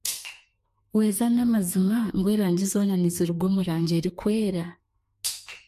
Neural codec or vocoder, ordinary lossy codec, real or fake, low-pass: codec, 32 kHz, 1.9 kbps, SNAC; MP3, 64 kbps; fake; 14.4 kHz